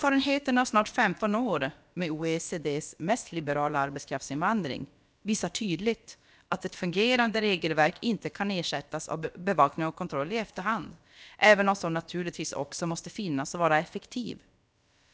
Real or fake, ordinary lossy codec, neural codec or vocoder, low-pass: fake; none; codec, 16 kHz, about 1 kbps, DyCAST, with the encoder's durations; none